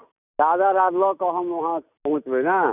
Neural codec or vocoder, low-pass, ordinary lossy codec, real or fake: none; 3.6 kHz; none; real